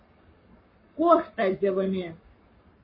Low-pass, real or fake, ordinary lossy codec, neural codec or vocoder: 5.4 kHz; fake; MP3, 24 kbps; codec, 44.1 kHz, 7.8 kbps, Pupu-Codec